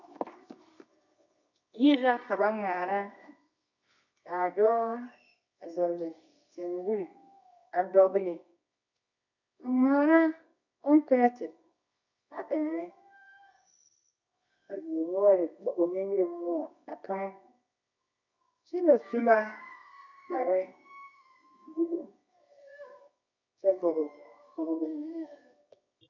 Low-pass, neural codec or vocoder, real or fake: 7.2 kHz; codec, 24 kHz, 0.9 kbps, WavTokenizer, medium music audio release; fake